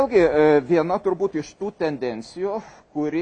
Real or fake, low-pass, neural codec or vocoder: real; 9.9 kHz; none